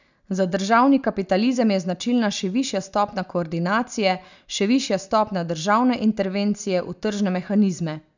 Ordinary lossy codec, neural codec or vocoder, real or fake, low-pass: none; none; real; 7.2 kHz